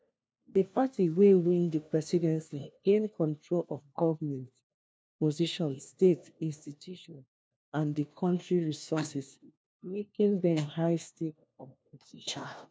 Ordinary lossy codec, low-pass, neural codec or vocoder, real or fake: none; none; codec, 16 kHz, 1 kbps, FunCodec, trained on LibriTTS, 50 frames a second; fake